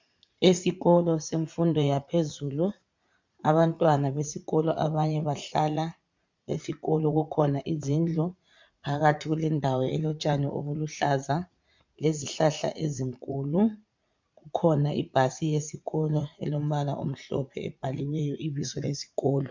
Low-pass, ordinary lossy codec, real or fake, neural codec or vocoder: 7.2 kHz; MP3, 64 kbps; fake; vocoder, 44.1 kHz, 80 mel bands, Vocos